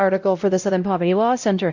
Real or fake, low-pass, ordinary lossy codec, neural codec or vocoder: fake; 7.2 kHz; Opus, 64 kbps; codec, 16 kHz, 0.5 kbps, X-Codec, WavLM features, trained on Multilingual LibriSpeech